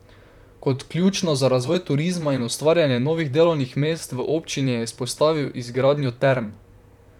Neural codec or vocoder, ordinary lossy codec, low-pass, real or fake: vocoder, 44.1 kHz, 128 mel bands, Pupu-Vocoder; none; 19.8 kHz; fake